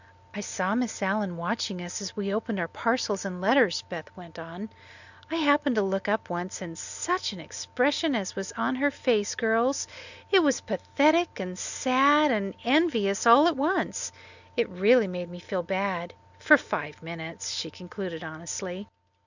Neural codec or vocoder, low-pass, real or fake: none; 7.2 kHz; real